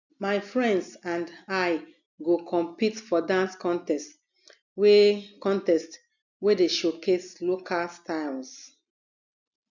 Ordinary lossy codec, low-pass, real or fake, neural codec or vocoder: none; 7.2 kHz; real; none